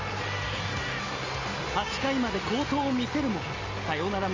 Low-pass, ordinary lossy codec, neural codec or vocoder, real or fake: 7.2 kHz; Opus, 32 kbps; none; real